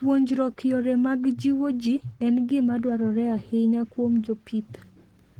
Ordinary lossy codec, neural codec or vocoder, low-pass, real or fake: Opus, 16 kbps; codec, 44.1 kHz, 7.8 kbps, Pupu-Codec; 19.8 kHz; fake